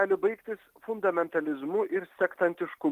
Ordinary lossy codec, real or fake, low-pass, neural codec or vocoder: Opus, 24 kbps; real; 14.4 kHz; none